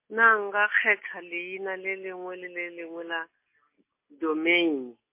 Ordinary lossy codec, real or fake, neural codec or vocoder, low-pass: MP3, 24 kbps; real; none; 3.6 kHz